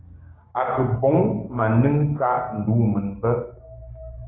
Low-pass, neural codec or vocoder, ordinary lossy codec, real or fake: 7.2 kHz; codec, 16 kHz, 6 kbps, DAC; AAC, 16 kbps; fake